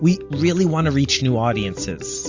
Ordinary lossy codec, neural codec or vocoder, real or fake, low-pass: AAC, 48 kbps; none; real; 7.2 kHz